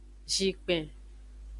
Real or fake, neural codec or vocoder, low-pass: real; none; 10.8 kHz